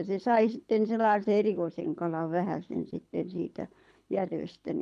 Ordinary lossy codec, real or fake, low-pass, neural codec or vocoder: none; fake; none; codec, 24 kHz, 6 kbps, HILCodec